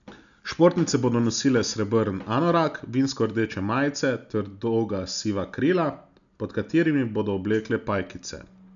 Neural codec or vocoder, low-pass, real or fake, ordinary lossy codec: none; 7.2 kHz; real; none